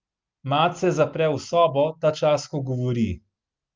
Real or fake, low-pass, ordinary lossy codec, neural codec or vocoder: real; 7.2 kHz; Opus, 24 kbps; none